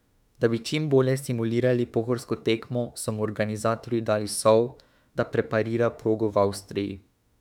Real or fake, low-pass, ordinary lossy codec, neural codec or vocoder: fake; 19.8 kHz; none; autoencoder, 48 kHz, 32 numbers a frame, DAC-VAE, trained on Japanese speech